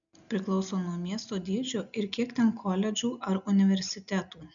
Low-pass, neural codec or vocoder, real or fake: 7.2 kHz; none; real